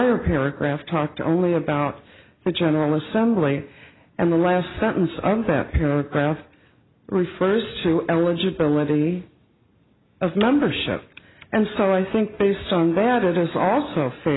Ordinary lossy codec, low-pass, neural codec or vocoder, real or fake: AAC, 16 kbps; 7.2 kHz; none; real